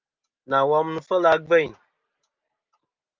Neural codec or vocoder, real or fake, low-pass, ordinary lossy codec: none; real; 7.2 kHz; Opus, 24 kbps